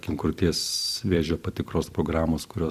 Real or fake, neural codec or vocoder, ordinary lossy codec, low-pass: fake; vocoder, 44.1 kHz, 128 mel bands every 256 samples, BigVGAN v2; Opus, 64 kbps; 14.4 kHz